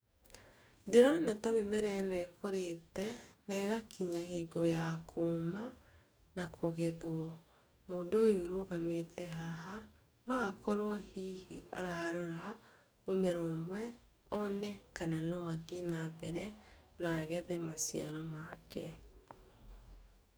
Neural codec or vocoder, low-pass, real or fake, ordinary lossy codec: codec, 44.1 kHz, 2.6 kbps, DAC; none; fake; none